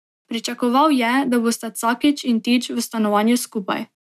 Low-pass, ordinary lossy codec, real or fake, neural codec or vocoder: 14.4 kHz; none; real; none